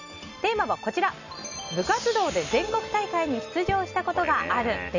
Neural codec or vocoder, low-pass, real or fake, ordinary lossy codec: none; 7.2 kHz; real; none